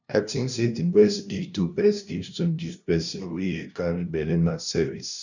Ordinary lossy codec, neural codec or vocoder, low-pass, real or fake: none; codec, 16 kHz, 0.5 kbps, FunCodec, trained on LibriTTS, 25 frames a second; 7.2 kHz; fake